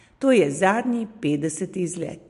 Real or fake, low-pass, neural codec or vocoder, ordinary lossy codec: real; 10.8 kHz; none; MP3, 64 kbps